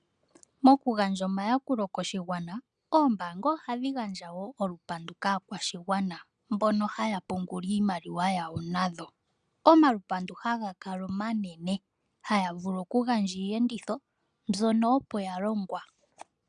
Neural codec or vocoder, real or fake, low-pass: none; real; 9.9 kHz